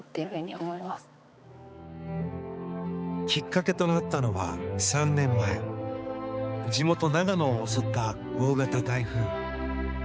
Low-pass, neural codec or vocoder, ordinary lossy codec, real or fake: none; codec, 16 kHz, 4 kbps, X-Codec, HuBERT features, trained on balanced general audio; none; fake